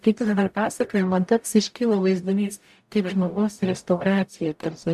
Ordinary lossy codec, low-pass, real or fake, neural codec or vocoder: AAC, 96 kbps; 14.4 kHz; fake; codec, 44.1 kHz, 0.9 kbps, DAC